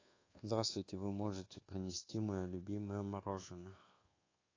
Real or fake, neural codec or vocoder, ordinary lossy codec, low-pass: fake; codec, 24 kHz, 1.2 kbps, DualCodec; AAC, 32 kbps; 7.2 kHz